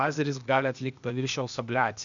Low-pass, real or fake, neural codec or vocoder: 7.2 kHz; fake; codec, 16 kHz, 0.8 kbps, ZipCodec